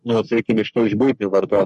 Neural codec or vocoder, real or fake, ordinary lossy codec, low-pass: codec, 44.1 kHz, 3.4 kbps, Pupu-Codec; fake; MP3, 48 kbps; 14.4 kHz